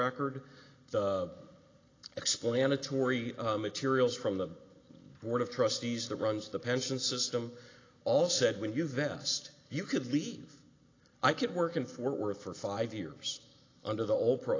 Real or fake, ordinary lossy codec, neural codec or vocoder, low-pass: real; AAC, 32 kbps; none; 7.2 kHz